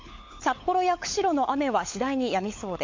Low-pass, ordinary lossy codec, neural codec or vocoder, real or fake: 7.2 kHz; MP3, 48 kbps; codec, 16 kHz, 16 kbps, FunCodec, trained on LibriTTS, 50 frames a second; fake